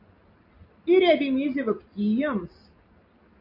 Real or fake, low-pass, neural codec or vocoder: real; 5.4 kHz; none